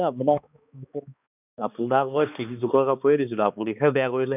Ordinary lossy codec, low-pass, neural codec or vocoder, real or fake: none; 3.6 kHz; codec, 16 kHz, 2 kbps, X-Codec, HuBERT features, trained on balanced general audio; fake